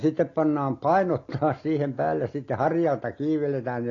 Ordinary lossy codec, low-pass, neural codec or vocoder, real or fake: AAC, 32 kbps; 7.2 kHz; none; real